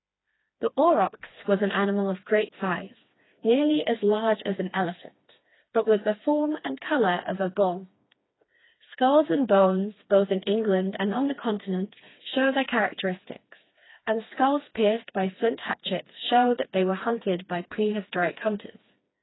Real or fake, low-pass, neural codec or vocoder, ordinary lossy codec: fake; 7.2 kHz; codec, 16 kHz, 2 kbps, FreqCodec, smaller model; AAC, 16 kbps